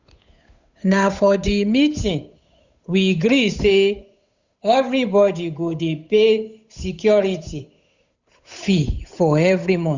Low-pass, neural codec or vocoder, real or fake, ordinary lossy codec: 7.2 kHz; codec, 16 kHz, 8 kbps, FunCodec, trained on Chinese and English, 25 frames a second; fake; Opus, 64 kbps